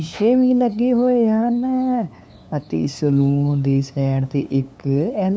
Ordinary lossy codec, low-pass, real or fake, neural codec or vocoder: none; none; fake; codec, 16 kHz, 2 kbps, FunCodec, trained on LibriTTS, 25 frames a second